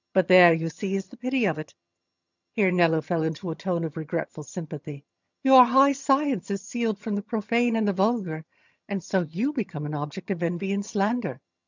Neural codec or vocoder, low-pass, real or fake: vocoder, 22.05 kHz, 80 mel bands, HiFi-GAN; 7.2 kHz; fake